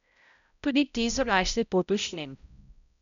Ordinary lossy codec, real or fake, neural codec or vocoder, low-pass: none; fake; codec, 16 kHz, 0.5 kbps, X-Codec, HuBERT features, trained on balanced general audio; 7.2 kHz